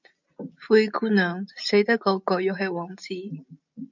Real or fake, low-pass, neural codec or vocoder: real; 7.2 kHz; none